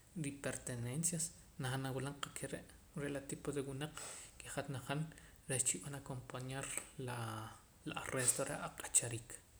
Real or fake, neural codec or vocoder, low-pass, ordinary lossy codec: real; none; none; none